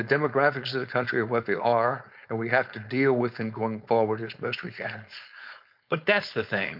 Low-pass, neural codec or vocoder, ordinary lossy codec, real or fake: 5.4 kHz; codec, 16 kHz, 4.8 kbps, FACodec; MP3, 48 kbps; fake